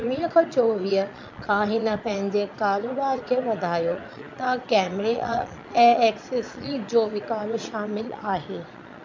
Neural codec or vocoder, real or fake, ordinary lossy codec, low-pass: vocoder, 22.05 kHz, 80 mel bands, Vocos; fake; MP3, 64 kbps; 7.2 kHz